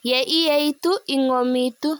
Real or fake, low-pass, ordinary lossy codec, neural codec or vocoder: real; none; none; none